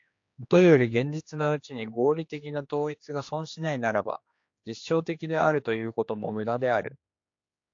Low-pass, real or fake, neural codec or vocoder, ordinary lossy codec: 7.2 kHz; fake; codec, 16 kHz, 2 kbps, X-Codec, HuBERT features, trained on general audio; AAC, 48 kbps